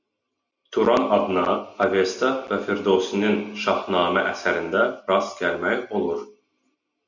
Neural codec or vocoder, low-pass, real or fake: none; 7.2 kHz; real